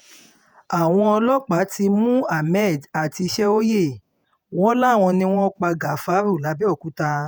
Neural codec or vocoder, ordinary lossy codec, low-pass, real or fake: vocoder, 48 kHz, 128 mel bands, Vocos; none; none; fake